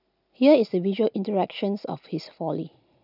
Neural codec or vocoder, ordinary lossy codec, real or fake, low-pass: none; none; real; 5.4 kHz